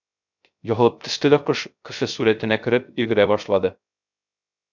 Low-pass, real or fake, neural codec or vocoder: 7.2 kHz; fake; codec, 16 kHz, 0.3 kbps, FocalCodec